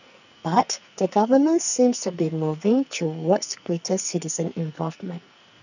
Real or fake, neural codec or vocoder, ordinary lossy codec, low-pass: fake; codec, 44.1 kHz, 2.6 kbps, SNAC; none; 7.2 kHz